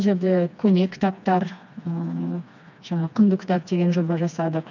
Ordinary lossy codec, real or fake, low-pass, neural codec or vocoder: none; fake; 7.2 kHz; codec, 16 kHz, 2 kbps, FreqCodec, smaller model